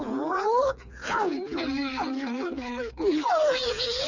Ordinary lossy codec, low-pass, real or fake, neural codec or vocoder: none; 7.2 kHz; fake; codec, 16 kHz, 4 kbps, FreqCodec, smaller model